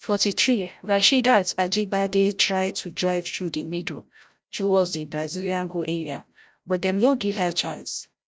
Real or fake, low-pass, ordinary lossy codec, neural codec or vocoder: fake; none; none; codec, 16 kHz, 0.5 kbps, FreqCodec, larger model